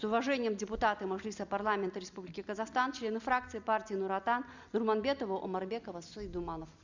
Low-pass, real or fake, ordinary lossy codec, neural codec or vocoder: 7.2 kHz; real; Opus, 64 kbps; none